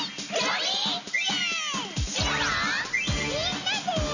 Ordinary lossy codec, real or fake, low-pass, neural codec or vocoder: none; real; 7.2 kHz; none